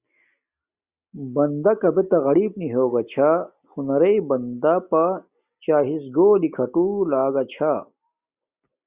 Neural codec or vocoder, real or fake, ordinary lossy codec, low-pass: none; real; Opus, 64 kbps; 3.6 kHz